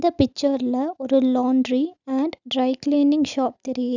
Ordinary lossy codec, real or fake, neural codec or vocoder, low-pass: none; real; none; 7.2 kHz